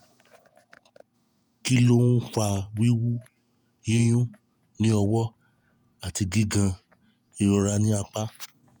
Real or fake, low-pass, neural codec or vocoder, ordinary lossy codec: fake; none; vocoder, 48 kHz, 128 mel bands, Vocos; none